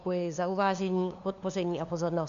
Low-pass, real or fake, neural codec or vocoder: 7.2 kHz; fake; codec, 16 kHz, 2 kbps, FunCodec, trained on LibriTTS, 25 frames a second